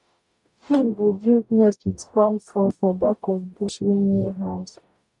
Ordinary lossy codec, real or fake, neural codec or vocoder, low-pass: none; fake; codec, 44.1 kHz, 0.9 kbps, DAC; 10.8 kHz